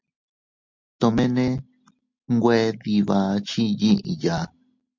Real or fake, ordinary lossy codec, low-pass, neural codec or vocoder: real; MP3, 48 kbps; 7.2 kHz; none